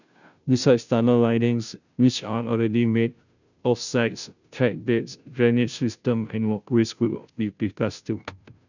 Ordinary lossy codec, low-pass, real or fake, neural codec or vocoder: none; 7.2 kHz; fake; codec, 16 kHz, 0.5 kbps, FunCodec, trained on Chinese and English, 25 frames a second